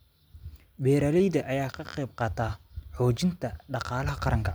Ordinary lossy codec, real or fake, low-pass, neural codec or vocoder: none; real; none; none